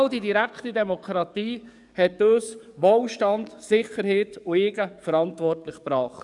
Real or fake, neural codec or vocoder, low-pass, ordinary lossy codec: fake; codec, 44.1 kHz, 7.8 kbps, DAC; 10.8 kHz; none